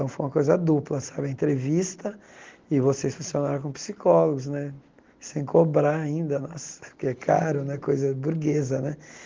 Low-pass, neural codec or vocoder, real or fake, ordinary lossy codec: 7.2 kHz; none; real; Opus, 16 kbps